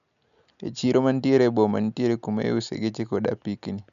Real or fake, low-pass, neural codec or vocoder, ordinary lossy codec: real; 7.2 kHz; none; none